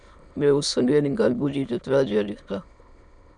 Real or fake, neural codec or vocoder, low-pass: fake; autoencoder, 22.05 kHz, a latent of 192 numbers a frame, VITS, trained on many speakers; 9.9 kHz